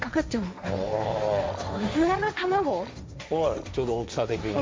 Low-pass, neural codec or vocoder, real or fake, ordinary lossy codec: none; codec, 16 kHz, 1.1 kbps, Voila-Tokenizer; fake; none